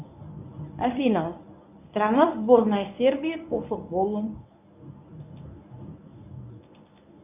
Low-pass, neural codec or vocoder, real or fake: 3.6 kHz; codec, 24 kHz, 0.9 kbps, WavTokenizer, medium speech release version 1; fake